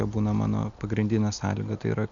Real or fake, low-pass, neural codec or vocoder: real; 7.2 kHz; none